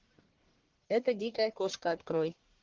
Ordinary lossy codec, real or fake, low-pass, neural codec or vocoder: Opus, 16 kbps; fake; 7.2 kHz; codec, 44.1 kHz, 1.7 kbps, Pupu-Codec